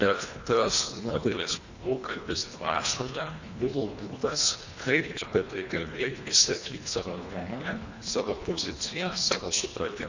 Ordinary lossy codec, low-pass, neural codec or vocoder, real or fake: Opus, 64 kbps; 7.2 kHz; codec, 24 kHz, 1.5 kbps, HILCodec; fake